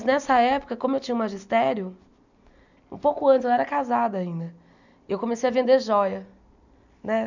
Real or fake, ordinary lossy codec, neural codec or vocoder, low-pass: real; none; none; 7.2 kHz